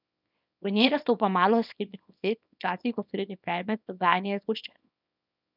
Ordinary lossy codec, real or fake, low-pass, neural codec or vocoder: none; fake; 5.4 kHz; codec, 24 kHz, 0.9 kbps, WavTokenizer, small release